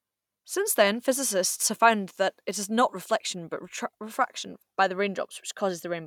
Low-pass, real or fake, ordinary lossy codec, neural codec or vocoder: 19.8 kHz; real; none; none